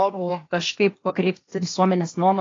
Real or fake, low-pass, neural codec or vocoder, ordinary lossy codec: fake; 7.2 kHz; codec, 16 kHz, 0.8 kbps, ZipCodec; AAC, 32 kbps